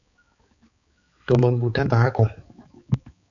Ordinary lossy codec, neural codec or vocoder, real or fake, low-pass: MP3, 96 kbps; codec, 16 kHz, 4 kbps, X-Codec, HuBERT features, trained on balanced general audio; fake; 7.2 kHz